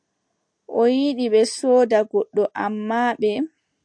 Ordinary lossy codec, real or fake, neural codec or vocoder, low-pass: AAC, 64 kbps; real; none; 9.9 kHz